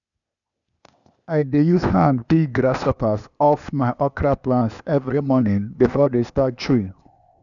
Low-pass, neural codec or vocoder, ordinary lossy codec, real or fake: 7.2 kHz; codec, 16 kHz, 0.8 kbps, ZipCodec; none; fake